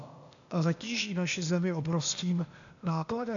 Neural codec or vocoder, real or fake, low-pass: codec, 16 kHz, 0.8 kbps, ZipCodec; fake; 7.2 kHz